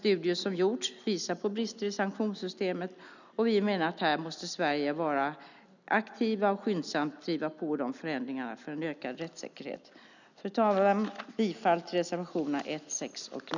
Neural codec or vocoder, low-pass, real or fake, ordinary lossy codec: none; 7.2 kHz; real; none